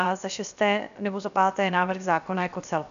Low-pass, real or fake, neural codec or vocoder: 7.2 kHz; fake; codec, 16 kHz, 0.7 kbps, FocalCodec